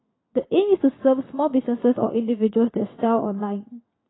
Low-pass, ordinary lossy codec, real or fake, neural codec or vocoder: 7.2 kHz; AAC, 16 kbps; fake; vocoder, 22.05 kHz, 80 mel bands, WaveNeXt